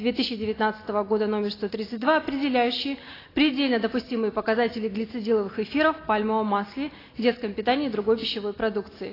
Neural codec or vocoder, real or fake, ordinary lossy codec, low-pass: none; real; AAC, 24 kbps; 5.4 kHz